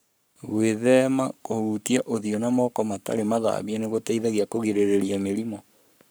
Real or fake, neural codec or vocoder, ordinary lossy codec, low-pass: fake; codec, 44.1 kHz, 7.8 kbps, Pupu-Codec; none; none